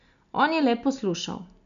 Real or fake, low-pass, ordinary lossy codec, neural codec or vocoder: real; 7.2 kHz; MP3, 96 kbps; none